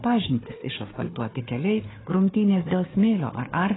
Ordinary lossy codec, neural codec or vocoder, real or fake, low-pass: AAC, 16 kbps; codec, 16 kHz, 4 kbps, FunCodec, trained on Chinese and English, 50 frames a second; fake; 7.2 kHz